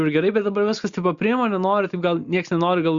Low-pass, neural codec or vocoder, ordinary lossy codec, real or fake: 7.2 kHz; none; Opus, 64 kbps; real